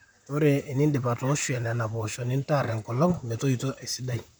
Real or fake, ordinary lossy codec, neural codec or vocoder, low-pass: fake; none; vocoder, 44.1 kHz, 128 mel bands, Pupu-Vocoder; none